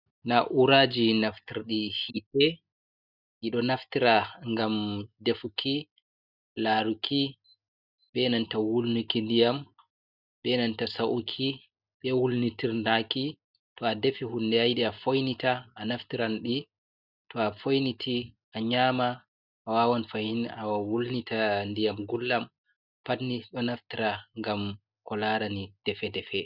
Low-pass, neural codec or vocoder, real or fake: 5.4 kHz; none; real